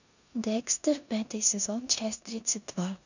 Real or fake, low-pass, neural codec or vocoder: fake; 7.2 kHz; codec, 16 kHz in and 24 kHz out, 0.9 kbps, LongCat-Audio-Codec, four codebook decoder